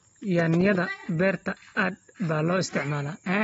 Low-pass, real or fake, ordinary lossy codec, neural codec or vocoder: 19.8 kHz; real; AAC, 24 kbps; none